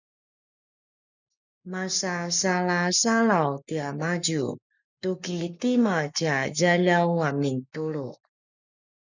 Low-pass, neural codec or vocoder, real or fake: 7.2 kHz; codec, 44.1 kHz, 7.8 kbps, Pupu-Codec; fake